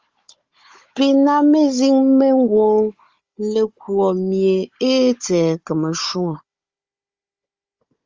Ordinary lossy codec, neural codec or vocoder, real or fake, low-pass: Opus, 32 kbps; codec, 16 kHz, 16 kbps, FunCodec, trained on Chinese and English, 50 frames a second; fake; 7.2 kHz